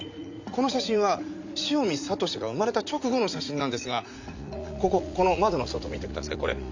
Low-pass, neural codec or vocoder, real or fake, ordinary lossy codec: 7.2 kHz; vocoder, 44.1 kHz, 80 mel bands, Vocos; fake; AAC, 48 kbps